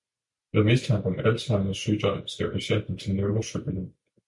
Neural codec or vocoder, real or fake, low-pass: none; real; 10.8 kHz